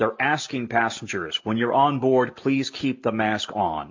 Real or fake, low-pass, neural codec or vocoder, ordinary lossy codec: real; 7.2 kHz; none; MP3, 48 kbps